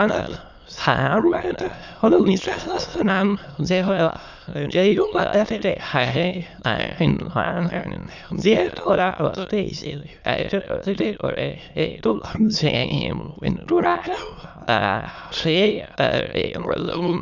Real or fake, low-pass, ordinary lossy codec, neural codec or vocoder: fake; 7.2 kHz; none; autoencoder, 22.05 kHz, a latent of 192 numbers a frame, VITS, trained on many speakers